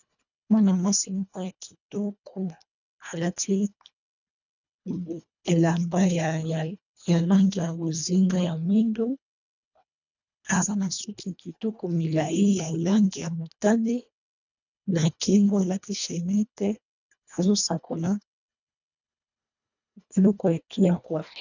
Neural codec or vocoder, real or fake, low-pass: codec, 24 kHz, 1.5 kbps, HILCodec; fake; 7.2 kHz